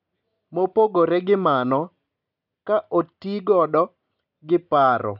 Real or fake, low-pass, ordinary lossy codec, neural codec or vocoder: real; 5.4 kHz; none; none